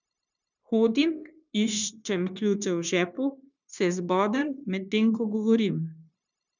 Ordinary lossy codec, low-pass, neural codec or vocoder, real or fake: none; 7.2 kHz; codec, 16 kHz, 0.9 kbps, LongCat-Audio-Codec; fake